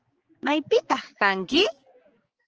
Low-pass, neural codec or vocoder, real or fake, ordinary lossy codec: 7.2 kHz; codec, 16 kHz, 4 kbps, X-Codec, HuBERT features, trained on balanced general audio; fake; Opus, 16 kbps